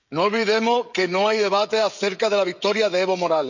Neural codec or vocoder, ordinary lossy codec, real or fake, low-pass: codec, 16 kHz, 16 kbps, FreqCodec, smaller model; none; fake; 7.2 kHz